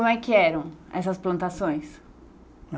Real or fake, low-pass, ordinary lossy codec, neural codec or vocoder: real; none; none; none